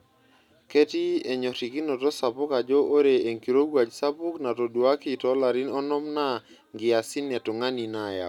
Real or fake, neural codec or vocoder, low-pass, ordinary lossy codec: real; none; 19.8 kHz; none